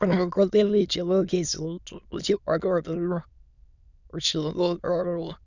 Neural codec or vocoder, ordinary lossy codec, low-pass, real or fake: autoencoder, 22.05 kHz, a latent of 192 numbers a frame, VITS, trained on many speakers; none; 7.2 kHz; fake